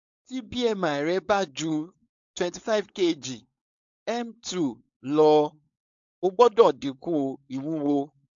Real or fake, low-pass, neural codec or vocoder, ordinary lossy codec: fake; 7.2 kHz; codec, 16 kHz, 4.8 kbps, FACodec; AAC, 64 kbps